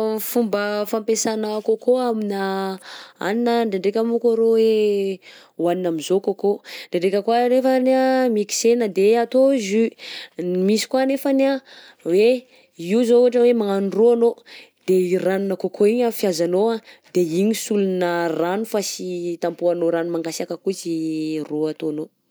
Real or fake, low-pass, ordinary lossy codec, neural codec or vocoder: real; none; none; none